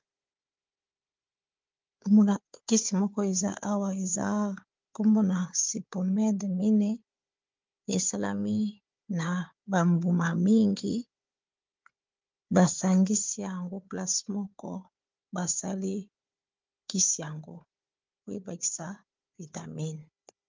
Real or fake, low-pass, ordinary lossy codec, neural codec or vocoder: fake; 7.2 kHz; Opus, 24 kbps; codec, 16 kHz, 4 kbps, FunCodec, trained on Chinese and English, 50 frames a second